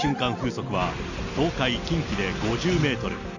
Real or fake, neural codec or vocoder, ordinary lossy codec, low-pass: real; none; none; 7.2 kHz